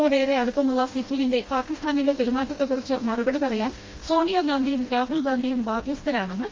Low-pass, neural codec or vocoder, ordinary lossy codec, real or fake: 7.2 kHz; codec, 16 kHz, 1 kbps, FreqCodec, smaller model; Opus, 32 kbps; fake